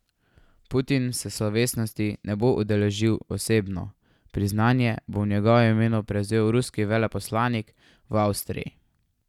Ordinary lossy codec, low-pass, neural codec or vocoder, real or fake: none; 19.8 kHz; none; real